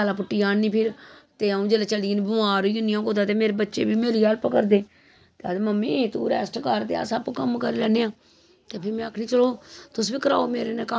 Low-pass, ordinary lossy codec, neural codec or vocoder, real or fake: none; none; none; real